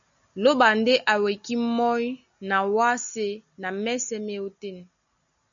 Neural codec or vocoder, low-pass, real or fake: none; 7.2 kHz; real